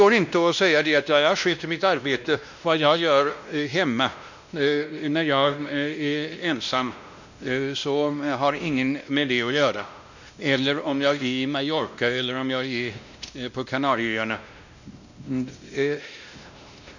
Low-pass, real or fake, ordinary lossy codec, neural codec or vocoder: 7.2 kHz; fake; none; codec, 16 kHz, 1 kbps, X-Codec, WavLM features, trained on Multilingual LibriSpeech